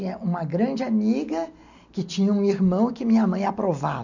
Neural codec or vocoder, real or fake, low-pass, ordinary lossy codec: none; real; 7.2 kHz; none